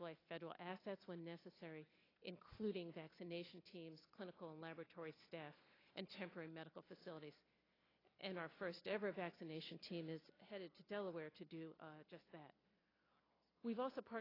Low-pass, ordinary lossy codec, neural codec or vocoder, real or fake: 5.4 kHz; AAC, 24 kbps; none; real